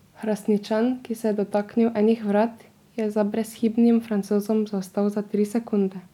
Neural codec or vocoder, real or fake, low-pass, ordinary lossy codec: none; real; 19.8 kHz; none